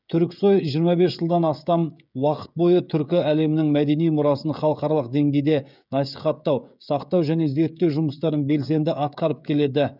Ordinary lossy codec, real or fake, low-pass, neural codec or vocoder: none; fake; 5.4 kHz; codec, 16 kHz, 16 kbps, FreqCodec, smaller model